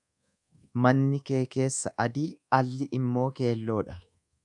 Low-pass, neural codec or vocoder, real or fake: 10.8 kHz; codec, 24 kHz, 1.2 kbps, DualCodec; fake